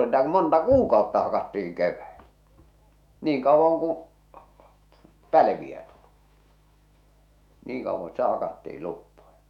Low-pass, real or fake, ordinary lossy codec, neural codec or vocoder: 19.8 kHz; fake; none; autoencoder, 48 kHz, 128 numbers a frame, DAC-VAE, trained on Japanese speech